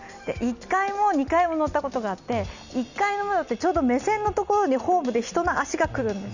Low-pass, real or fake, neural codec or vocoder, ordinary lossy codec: 7.2 kHz; real; none; none